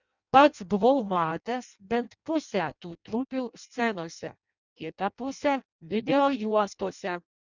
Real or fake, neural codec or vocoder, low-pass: fake; codec, 16 kHz in and 24 kHz out, 0.6 kbps, FireRedTTS-2 codec; 7.2 kHz